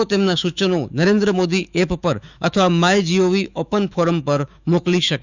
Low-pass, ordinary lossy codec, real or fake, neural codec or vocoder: 7.2 kHz; none; fake; autoencoder, 48 kHz, 128 numbers a frame, DAC-VAE, trained on Japanese speech